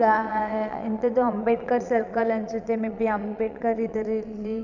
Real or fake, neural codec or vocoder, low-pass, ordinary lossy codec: fake; vocoder, 22.05 kHz, 80 mel bands, Vocos; 7.2 kHz; none